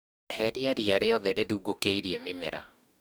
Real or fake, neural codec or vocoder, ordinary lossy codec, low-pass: fake; codec, 44.1 kHz, 2.6 kbps, DAC; none; none